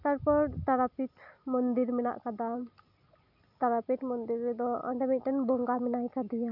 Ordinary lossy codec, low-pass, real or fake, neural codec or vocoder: none; 5.4 kHz; real; none